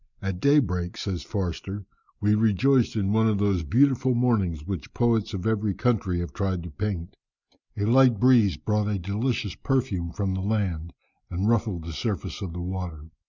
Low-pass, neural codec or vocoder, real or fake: 7.2 kHz; none; real